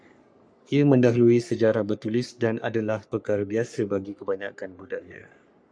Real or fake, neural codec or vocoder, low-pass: fake; codec, 44.1 kHz, 3.4 kbps, Pupu-Codec; 9.9 kHz